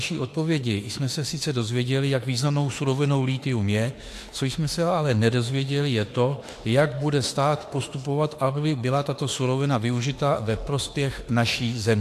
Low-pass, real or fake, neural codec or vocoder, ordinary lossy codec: 14.4 kHz; fake; autoencoder, 48 kHz, 32 numbers a frame, DAC-VAE, trained on Japanese speech; AAC, 64 kbps